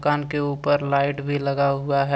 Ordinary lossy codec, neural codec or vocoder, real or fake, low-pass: none; none; real; none